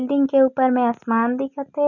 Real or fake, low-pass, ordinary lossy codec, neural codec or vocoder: real; 7.2 kHz; none; none